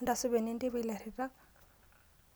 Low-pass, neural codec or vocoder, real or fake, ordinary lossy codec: none; none; real; none